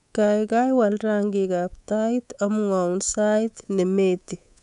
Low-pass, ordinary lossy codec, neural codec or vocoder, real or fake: 10.8 kHz; none; codec, 24 kHz, 3.1 kbps, DualCodec; fake